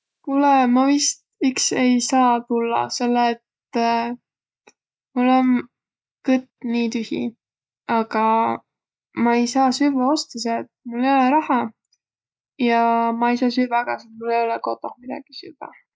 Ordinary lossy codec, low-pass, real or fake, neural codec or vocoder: none; none; real; none